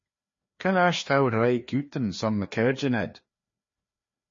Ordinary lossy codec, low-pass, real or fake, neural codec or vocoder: MP3, 32 kbps; 7.2 kHz; fake; codec, 16 kHz, 2 kbps, FreqCodec, larger model